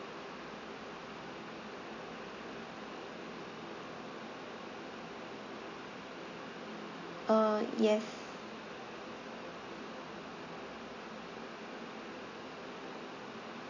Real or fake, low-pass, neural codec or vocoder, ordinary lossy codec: real; 7.2 kHz; none; none